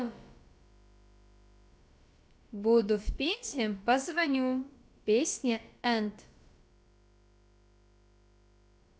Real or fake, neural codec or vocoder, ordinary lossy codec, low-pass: fake; codec, 16 kHz, about 1 kbps, DyCAST, with the encoder's durations; none; none